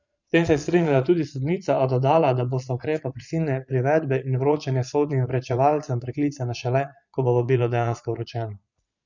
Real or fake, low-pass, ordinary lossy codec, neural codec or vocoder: fake; 7.2 kHz; none; vocoder, 22.05 kHz, 80 mel bands, Vocos